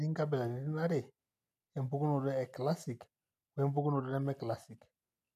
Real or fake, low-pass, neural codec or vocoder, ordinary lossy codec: real; 14.4 kHz; none; none